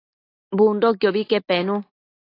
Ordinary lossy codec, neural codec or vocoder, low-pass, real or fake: AAC, 24 kbps; none; 5.4 kHz; real